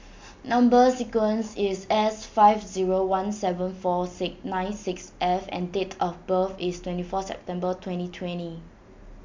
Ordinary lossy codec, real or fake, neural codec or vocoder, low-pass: MP3, 64 kbps; real; none; 7.2 kHz